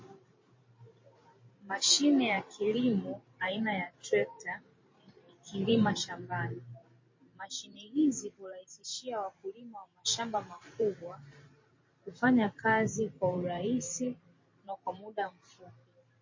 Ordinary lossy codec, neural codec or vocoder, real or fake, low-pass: MP3, 32 kbps; vocoder, 44.1 kHz, 128 mel bands every 512 samples, BigVGAN v2; fake; 7.2 kHz